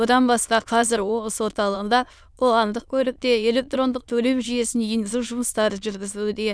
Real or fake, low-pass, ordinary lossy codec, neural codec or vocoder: fake; none; none; autoencoder, 22.05 kHz, a latent of 192 numbers a frame, VITS, trained on many speakers